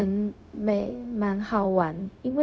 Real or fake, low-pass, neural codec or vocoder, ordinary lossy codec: fake; none; codec, 16 kHz, 0.4 kbps, LongCat-Audio-Codec; none